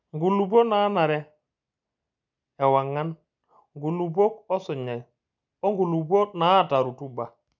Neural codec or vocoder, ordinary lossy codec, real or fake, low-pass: none; none; real; 7.2 kHz